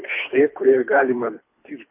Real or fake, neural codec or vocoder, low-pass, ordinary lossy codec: fake; codec, 24 kHz, 3 kbps, HILCodec; 3.6 kHz; MP3, 32 kbps